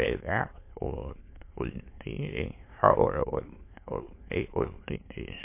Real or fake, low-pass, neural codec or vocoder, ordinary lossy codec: fake; 3.6 kHz; autoencoder, 22.05 kHz, a latent of 192 numbers a frame, VITS, trained on many speakers; MP3, 24 kbps